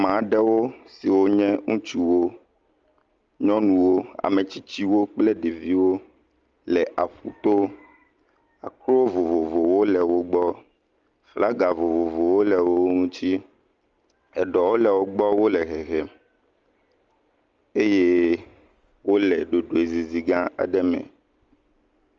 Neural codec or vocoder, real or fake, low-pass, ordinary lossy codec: none; real; 7.2 kHz; Opus, 32 kbps